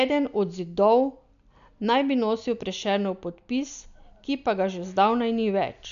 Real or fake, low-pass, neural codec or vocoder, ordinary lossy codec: real; 7.2 kHz; none; none